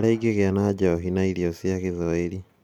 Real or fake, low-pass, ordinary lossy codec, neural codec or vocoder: real; 19.8 kHz; MP3, 96 kbps; none